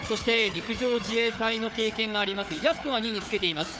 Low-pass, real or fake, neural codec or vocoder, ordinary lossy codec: none; fake; codec, 16 kHz, 4 kbps, FunCodec, trained on Chinese and English, 50 frames a second; none